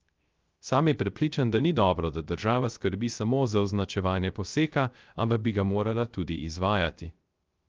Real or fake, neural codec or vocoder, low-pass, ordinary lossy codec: fake; codec, 16 kHz, 0.3 kbps, FocalCodec; 7.2 kHz; Opus, 32 kbps